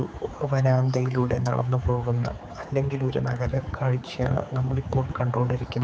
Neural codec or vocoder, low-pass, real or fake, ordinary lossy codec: codec, 16 kHz, 4 kbps, X-Codec, HuBERT features, trained on general audio; none; fake; none